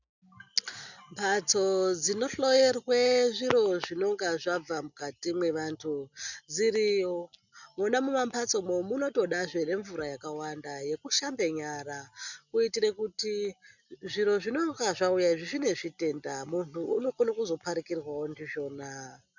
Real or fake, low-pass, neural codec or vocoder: real; 7.2 kHz; none